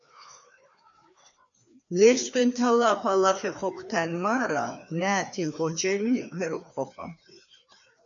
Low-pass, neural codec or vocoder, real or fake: 7.2 kHz; codec, 16 kHz, 2 kbps, FreqCodec, larger model; fake